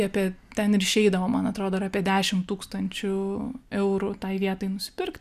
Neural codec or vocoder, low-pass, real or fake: none; 14.4 kHz; real